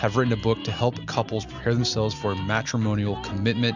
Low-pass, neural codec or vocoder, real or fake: 7.2 kHz; none; real